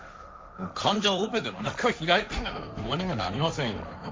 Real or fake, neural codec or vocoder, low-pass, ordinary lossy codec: fake; codec, 16 kHz, 1.1 kbps, Voila-Tokenizer; none; none